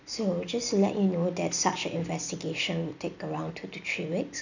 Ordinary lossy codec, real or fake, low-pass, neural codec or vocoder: none; real; 7.2 kHz; none